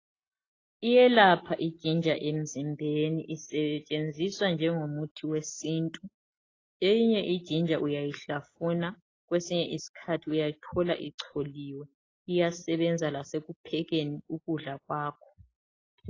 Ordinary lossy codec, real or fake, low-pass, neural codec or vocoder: AAC, 32 kbps; real; 7.2 kHz; none